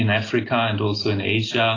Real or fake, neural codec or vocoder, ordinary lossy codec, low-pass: real; none; AAC, 32 kbps; 7.2 kHz